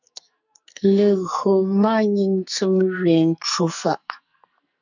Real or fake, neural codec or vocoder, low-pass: fake; codec, 44.1 kHz, 2.6 kbps, SNAC; 7.2 kHz